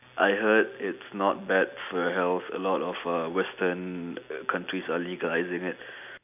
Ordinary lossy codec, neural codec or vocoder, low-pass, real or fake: none; none; 3.6 kHz; real